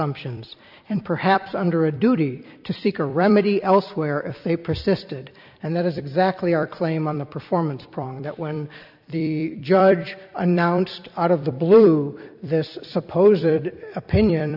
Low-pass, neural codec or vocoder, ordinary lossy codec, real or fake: 5.4 kHz; vocoder, 44.1 kHz, 128 mel bands every 256 samples, BigVGAN v2; AAC, 48 kbps; fake